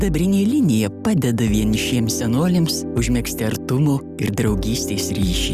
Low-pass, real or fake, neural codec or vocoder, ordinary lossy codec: 14.4 kHz; real; none; Opus, 64 kbps